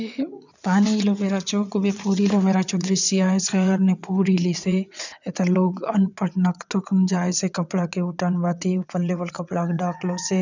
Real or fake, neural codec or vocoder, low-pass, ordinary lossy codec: fake; vocoder, 22.05 kHz, 80 mel bands, WaveNeXt; 7.2 kHz; none